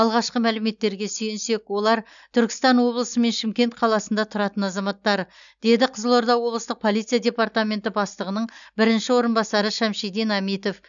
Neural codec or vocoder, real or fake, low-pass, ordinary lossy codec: none; real; 7.2 kHz; none